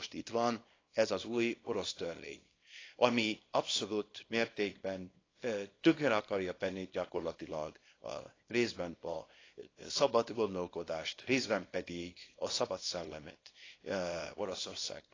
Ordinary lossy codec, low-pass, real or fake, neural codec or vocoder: AAC, 32 kbps; 7.2 kHz; fake; codec, 24 kHz, 0.9 kbps, WavTokenizer, small release